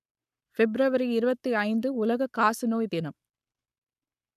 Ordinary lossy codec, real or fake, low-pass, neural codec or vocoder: none; fake; 14.4 kHz; codec, 44.1 kHz, 7.8 kbps, Pupu-Codec